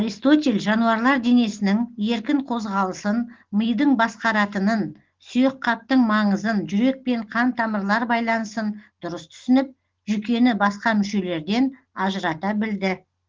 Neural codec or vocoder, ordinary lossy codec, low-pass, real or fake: none; Opus, 16 kbps; 7.2 kHz; real